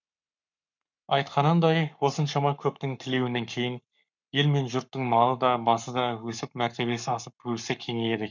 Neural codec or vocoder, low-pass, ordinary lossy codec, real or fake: codec, 44.1 kHz, 7.8 kbps, Pupu-Codec; 7.2 kHz; none; fake